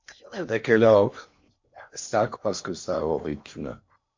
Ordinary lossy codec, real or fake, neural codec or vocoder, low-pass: MP3, 48 kbps; fake; codec, 16 kHz in and 24 kHz out, 0.8 kbps, FocalCodec, streaming, 65536 codes; 7.2 kHz